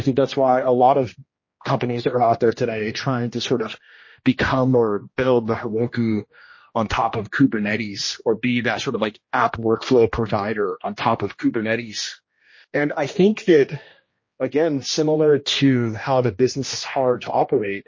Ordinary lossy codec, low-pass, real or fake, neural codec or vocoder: MP3, 32 kbps; 7.2 kHz; fake; codec, 16 kHz, 1 kbps, X-Codec, HuBERT features, trained on general audio